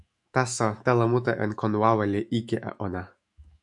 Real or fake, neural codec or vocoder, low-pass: fake; autoencoder, 48 kHz, 128 numbers a frame, DAC-VAE, trained on Japanese speech; 10.8 kHz